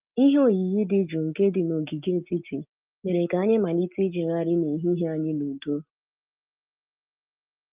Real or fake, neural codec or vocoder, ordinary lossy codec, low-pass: real; none; Opus, 24 kbps; 3.6 kHz